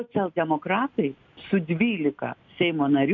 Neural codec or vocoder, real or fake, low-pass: none; real; 7.2 kHz